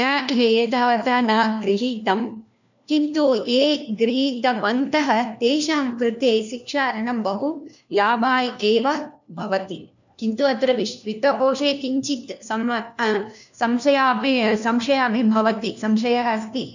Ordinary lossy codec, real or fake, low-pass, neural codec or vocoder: none; fake; 7.2 kHz; codec, 16 kHz, 1 kbps, FunCodec, trained on LibriTTS, 50 frames a second